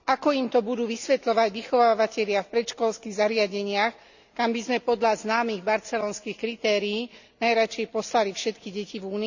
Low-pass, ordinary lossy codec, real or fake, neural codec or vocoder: 7.2 kHz; none; real; none